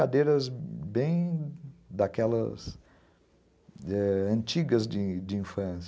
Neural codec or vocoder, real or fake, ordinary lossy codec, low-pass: none; real; none; none